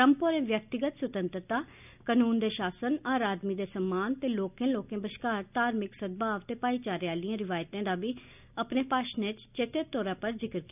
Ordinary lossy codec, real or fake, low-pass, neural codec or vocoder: none; real; 3.6 kHz; none